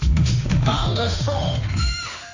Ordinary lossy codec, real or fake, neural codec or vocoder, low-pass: AAC, 48 kbps; fake; autoencoder, 48 kHz, 32 numbers a frame, DAC-VAE, trained on Japanese speech; 7.2 kHz